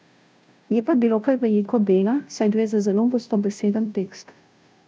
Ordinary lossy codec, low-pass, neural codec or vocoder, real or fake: none; none; codec, 16 kHz, 0.5 kbps, FunCodec, trained on Chinese and English, 25 frames a second; fake